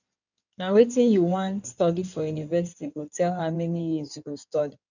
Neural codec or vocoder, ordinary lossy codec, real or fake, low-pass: codec, 16 kHz in and 24 kHz out, 2.2 kbps, FireRedTTS-2 codec; none; fake; 7.2 kHz